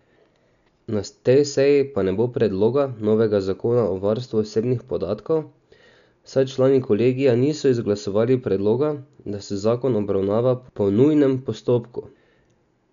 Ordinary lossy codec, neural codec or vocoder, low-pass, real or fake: none; none; 7.2 kHz; real